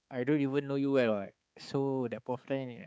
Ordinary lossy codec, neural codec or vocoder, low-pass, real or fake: none; codec, 16 kHz, 4 kbps, X-Codec, HuBERT features, trained on balanced general audio; none; fake